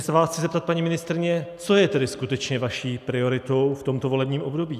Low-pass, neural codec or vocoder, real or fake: 14.4 kHz; none; real